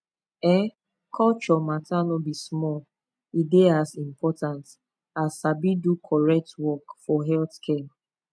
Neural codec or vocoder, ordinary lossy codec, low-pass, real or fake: none; none; none; real